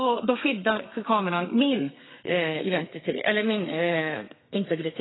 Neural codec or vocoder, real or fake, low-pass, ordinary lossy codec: codec, 32 kHz, 1.9 kbps, SNAC; fake; 7.2 kHz; AAC, 16 kbps